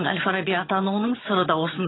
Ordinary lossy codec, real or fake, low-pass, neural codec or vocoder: AAC, 16 kbps; fake; 7.2 kHz; vocoder, 22.05 kHz, 80 mel bands, HiFi-GAN